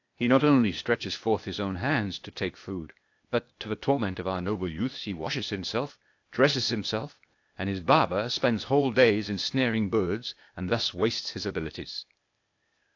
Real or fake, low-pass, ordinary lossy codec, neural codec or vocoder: fake; 7.2 kHz; AAC, 48 kbps; codec, 16 kHz, 0.8 kbps, ZipCodec